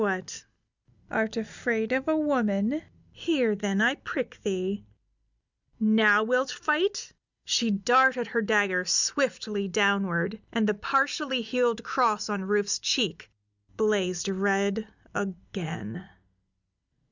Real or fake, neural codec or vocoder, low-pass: real; none; 7.2 kHz